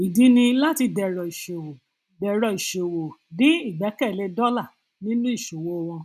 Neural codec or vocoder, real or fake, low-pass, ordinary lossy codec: none; real; 14.4 kHz; none